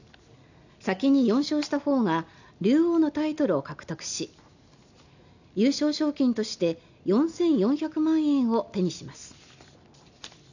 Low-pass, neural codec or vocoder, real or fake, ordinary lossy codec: 7.2 kHz; none; real; none